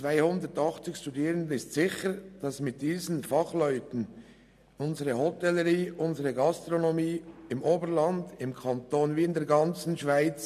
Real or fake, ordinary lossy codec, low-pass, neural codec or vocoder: real; none; 14.4 kHz; none